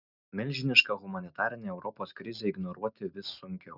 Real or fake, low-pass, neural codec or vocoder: real; 5.4 kHz; none